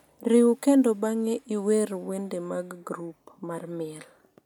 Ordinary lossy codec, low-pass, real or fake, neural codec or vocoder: none; 19.8 kHz; real; none